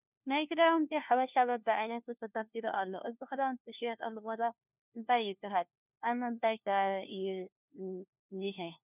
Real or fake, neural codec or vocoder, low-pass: fake; codec, 16 kHz, 1 kbps, FunCodec, trained on LibriTTS, 50 frames a second; 3.6 kHz